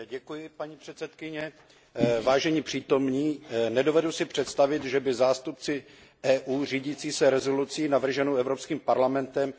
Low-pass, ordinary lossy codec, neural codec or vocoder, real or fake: none; none; none; real